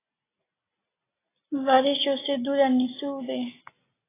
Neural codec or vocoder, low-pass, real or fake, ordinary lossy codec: none; 3.6 kHz; real; AAC, 16 kbps